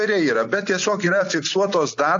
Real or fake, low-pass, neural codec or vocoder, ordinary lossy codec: real; 7.2 kHz; none; AAC, 48 kbps